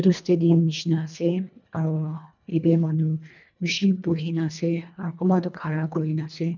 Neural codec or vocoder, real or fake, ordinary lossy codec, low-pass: codec, 24 kHz, 1.5 kbps, HILCodec; fake; none; 7.2 kHz